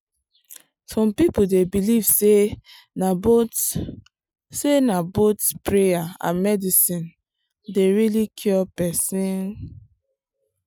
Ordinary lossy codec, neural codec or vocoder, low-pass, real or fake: none; none; none; real